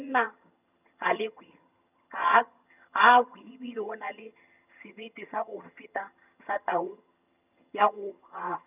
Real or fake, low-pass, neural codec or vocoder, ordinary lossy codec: fake; 3.6 kHz; vocoder, 22.05 kHz, 80 mel bands, HiFi-GAN; none